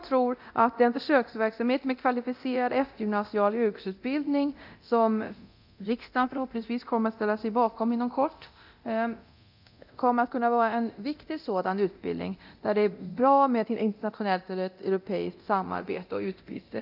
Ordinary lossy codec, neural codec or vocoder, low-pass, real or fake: none; codec, 24 kHz, 0.9 kbps, DualCodec; 5.4 kHz; fake